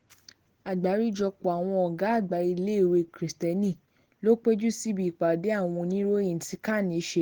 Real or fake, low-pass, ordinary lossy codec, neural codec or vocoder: real; 19.8 kHz; Opus, 16 kbps; none